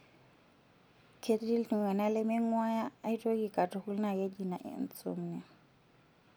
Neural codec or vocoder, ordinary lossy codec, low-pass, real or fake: none; none; none; real